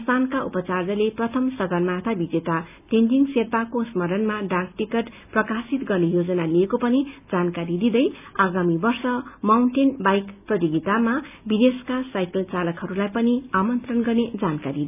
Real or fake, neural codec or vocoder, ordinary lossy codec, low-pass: real; none; none; 3.6 kHz